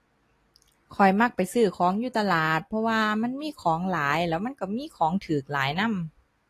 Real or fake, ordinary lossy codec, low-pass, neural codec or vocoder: real; AAC, 48 kbps; 14.4 kHz; none